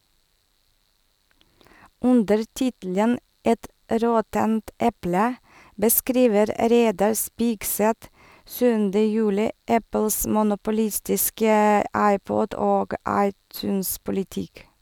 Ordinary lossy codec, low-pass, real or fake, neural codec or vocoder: none; none; real; none